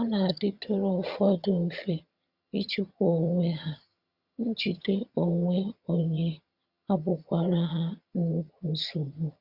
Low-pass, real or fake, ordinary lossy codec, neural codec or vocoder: 5.4 kHz; fake; Opus, 64 kbps; vocoder, 22.05 kHz, 80 mel bands, HiFi-GAN